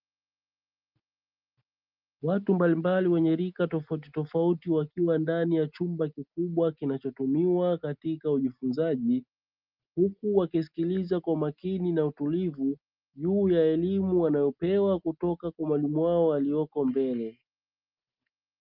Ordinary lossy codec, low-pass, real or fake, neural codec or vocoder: Opus, 24 kbps; 5.4 kHz; real; none